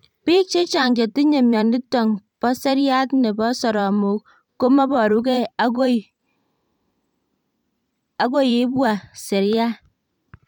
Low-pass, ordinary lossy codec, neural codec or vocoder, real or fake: 19.8 kHz; none; vocoder, 44.1 kHz, 128 mel bands every 512 samples, BigVGAN v2; fake